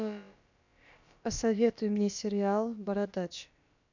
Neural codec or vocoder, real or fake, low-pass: codec, 16 kHz, about 1 kbps, DyCAST, with the encoder's durations; fake; 7.2 kHz